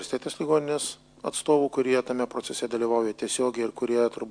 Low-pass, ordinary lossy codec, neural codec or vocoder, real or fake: 9.9 kHz; MP3, 64 kbps; none; real